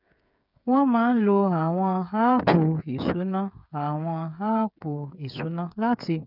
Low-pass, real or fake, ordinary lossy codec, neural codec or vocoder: 5.4 kHz; fake; none; codec, 16 kHz, 8 kbps, FreqCodec, smaller model